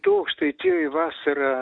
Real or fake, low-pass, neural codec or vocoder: real; 9.9 kHz; none